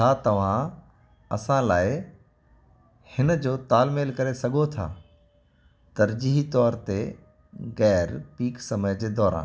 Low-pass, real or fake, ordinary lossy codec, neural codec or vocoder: none; real; none; none